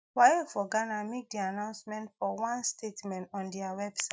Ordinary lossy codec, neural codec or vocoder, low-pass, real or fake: none; none; none; real